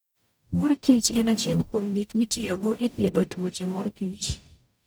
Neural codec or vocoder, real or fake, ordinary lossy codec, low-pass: codec, 44.1 kHz, 0.9 kbps, DAC; fake; none; none